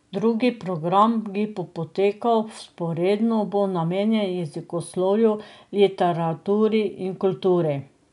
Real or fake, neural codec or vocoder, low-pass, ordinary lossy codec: real; none; 10.8 kHz; none